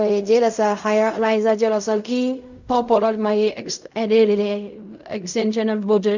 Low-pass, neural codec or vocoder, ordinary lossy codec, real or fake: 7.2 kHz; codec, 16 kHz in and 24 kHz out, 0.4 kbps, LongCat-Audio-Codec, fine tuned four codebook decoder; none; fake